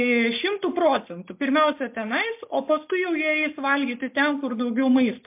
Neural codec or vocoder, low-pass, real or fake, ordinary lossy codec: none; 3.6 kHz; real; MP3, 32 kbps